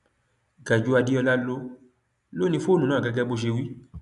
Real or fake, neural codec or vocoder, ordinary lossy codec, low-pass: real; none; none; 10.8 kHz